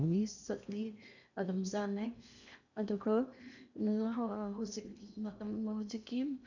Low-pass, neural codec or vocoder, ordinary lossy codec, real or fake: 7.2 kHz; codec, 16 kHz in and 24 kHz out, 0.8 kbps, FocalCodec, streaming, 65536 codes; none; fake